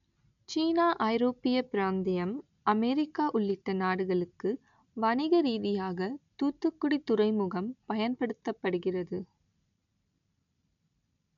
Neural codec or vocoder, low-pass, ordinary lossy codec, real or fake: none; 7.2 kHz; none; real